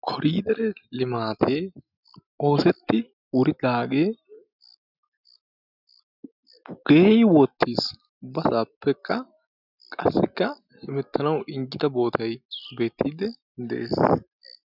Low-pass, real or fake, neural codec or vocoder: 5.4 kHz; real; none